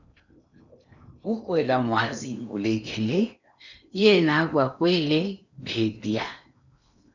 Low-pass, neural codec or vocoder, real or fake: 7.2 kHz; codec, 16 kHz in and 24 kHz out, 0.8 kbps, FocalCodec, streaming, 65536 codes; fake